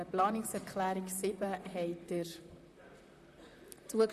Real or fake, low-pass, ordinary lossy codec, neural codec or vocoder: fake; 14.4 kHz; none; vocoder, 44.1 kHz, 128 mel bands, Pupu-Vocoder